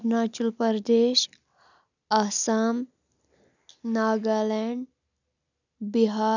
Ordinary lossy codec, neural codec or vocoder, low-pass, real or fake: none; none; 7.2 kHz; real